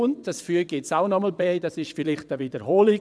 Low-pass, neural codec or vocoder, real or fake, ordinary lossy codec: none; vocoder, 22.05 kHz, 80 mel bands, WaveNeXt; fake; none